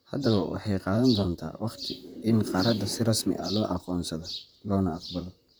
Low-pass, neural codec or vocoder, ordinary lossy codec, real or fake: none; vocoder, 44.1 kHz, 128 mel bands, Pupu-Vocoder; none; fake